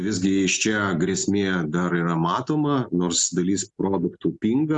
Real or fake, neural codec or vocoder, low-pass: real; none; 10.8 kHz